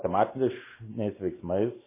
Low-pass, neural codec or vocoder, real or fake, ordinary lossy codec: 3.6 kHz; none; real; MP3, 16 kbps